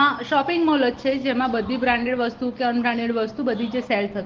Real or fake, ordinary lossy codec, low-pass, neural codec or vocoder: real; Opus, 16 kbps; 7.2 kHz; none